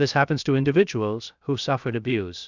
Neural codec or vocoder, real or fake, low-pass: codec, 16 kHz, 0.7 kbps, FocalCodec; fake; 7.2 kHz